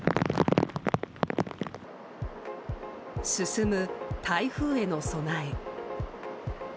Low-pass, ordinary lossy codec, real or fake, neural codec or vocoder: none; none; real; none